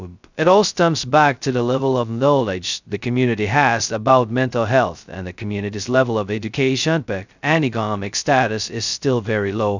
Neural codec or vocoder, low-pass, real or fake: codec, 16 kHz, 0.2 kbps, FocalCodec; 7.2 kHz; fake